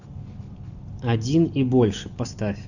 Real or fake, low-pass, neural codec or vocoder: real; 7.2 kHz; none